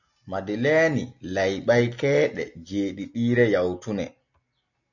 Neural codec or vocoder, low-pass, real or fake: none; 7.2 kHz; real